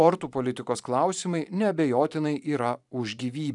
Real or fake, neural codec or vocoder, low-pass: fake; vocoder, 44.1 kHz, 128 mel bands every 512 samples, BigVGAN v2; 10.8 kHz